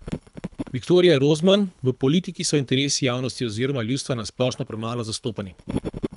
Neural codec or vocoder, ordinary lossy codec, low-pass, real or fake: codec, 24 kHz, 3 kbps, HILCodec; none; 10.8 kHz; fake